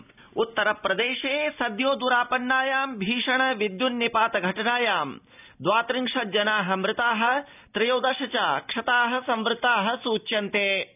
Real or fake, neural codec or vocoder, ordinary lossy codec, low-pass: real; none; none; 3.6 kHz